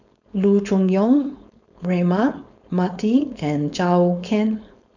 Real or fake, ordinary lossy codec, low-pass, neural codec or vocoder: fake; none; 7.2 kHz; codec, 16 kHz, 4.8 kbps, FACodec